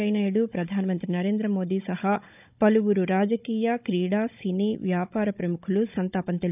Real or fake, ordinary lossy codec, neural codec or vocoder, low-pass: fake; none; codec, 16 kHz, 16 kbps, FunCodec, trained on Chinese and English, 50 frames a second; 3.6 kHz